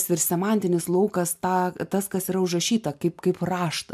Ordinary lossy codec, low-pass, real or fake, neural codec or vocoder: MP3, 96 kbps; 14.4 kHz; real; none